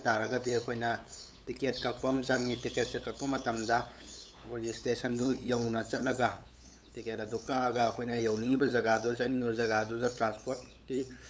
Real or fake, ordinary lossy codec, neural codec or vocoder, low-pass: fake; none; codec, 16 kHz, 8 kbps, FunCodec, trained on LibriTTS, 25 frames a second; none